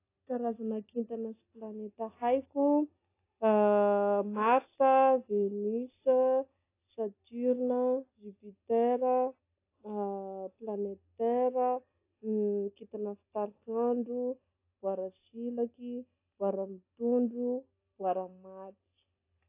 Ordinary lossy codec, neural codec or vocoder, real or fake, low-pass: AAC, 24 kbps; none; real; 3.6 kHz